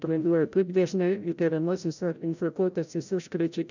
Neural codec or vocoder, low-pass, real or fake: codec, 16 kHz, 0.5 kbps, FreqCodec, larger model; 7.2 kHz; fake